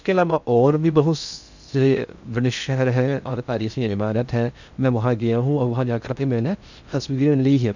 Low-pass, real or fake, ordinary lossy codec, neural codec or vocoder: 7.2 kHz; fake; none; codec, 16 kHz in and 24 kHz out, 0.6 kbps, FocalCodec, streaming, 2048 codes